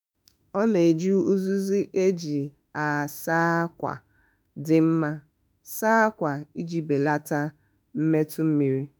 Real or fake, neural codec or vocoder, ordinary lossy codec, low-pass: fake; autoencoder, 48 kHz, 32 numbers a frame, DAC-VAE, trained on Japanese speech; none; none